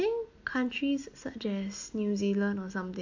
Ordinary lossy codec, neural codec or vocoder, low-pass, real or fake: AAC, 48 kbps; none; 7.2 kHz; real